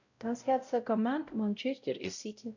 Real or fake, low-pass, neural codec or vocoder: fake; 7.2 kHz; codec, 16 kHz, 0.5 kbps, X-Codec, WavLM features, trained on Multilingual LibriSpeech